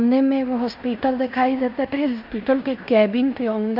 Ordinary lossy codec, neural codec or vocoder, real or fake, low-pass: none; codec, 16 kHz in and 24 kHz out, 0.9 kbps, LongCat-Audio-Codec, fine tuned four codebook decoder; fake; 5.4 kHz